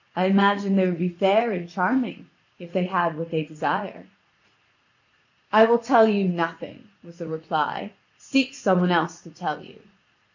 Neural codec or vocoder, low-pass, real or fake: vocoder, 44.1 kHz, 80 mel bands, Vocos; 7.2 kHz; fake